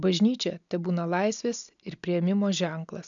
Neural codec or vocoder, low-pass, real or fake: none; 7.2 kHz; real